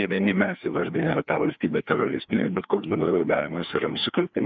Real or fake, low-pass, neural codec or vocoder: fake; 7.2 kHz; codec, 16 kHz, 2 kbps, FreqCodec, larger model